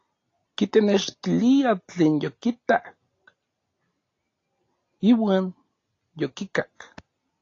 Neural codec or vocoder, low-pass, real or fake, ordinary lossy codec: none; 7.2 kHz; real; AAC, 32 kbps